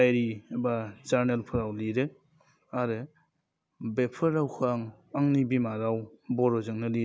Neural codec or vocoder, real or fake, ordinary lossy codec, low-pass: none; real; none; none